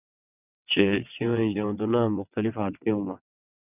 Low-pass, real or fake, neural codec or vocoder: 3.6 kHz; fake; vocoder, 22.05 kHz, 80 mel bands, WaveNeXt